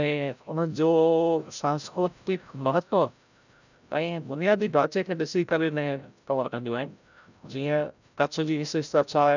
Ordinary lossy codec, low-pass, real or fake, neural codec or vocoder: none; 7.2 kHz; fake; codec, 16 kHz, 0.5 kbps, FreqCodec, larger model